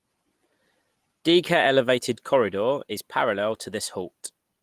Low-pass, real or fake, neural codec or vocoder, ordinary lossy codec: 14.4 kHz; real; none; Opus, 24 kbps